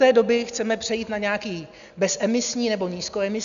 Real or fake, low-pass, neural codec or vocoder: real; 7.2 kHz; none